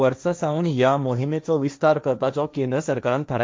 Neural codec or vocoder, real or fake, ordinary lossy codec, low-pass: codec, 16 kHz, 1.1 kbps, Voila-Tokenizer; fake; none; none